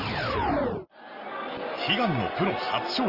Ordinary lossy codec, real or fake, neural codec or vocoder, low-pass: Opus, 24 kbps; real; none; 5.4 kHz